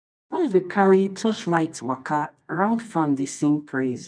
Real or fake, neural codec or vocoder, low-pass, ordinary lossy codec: fake; codec, 24 kHz, 0.9 kbps, WavTokenizer, medium music audio release; 9.9 kHz; none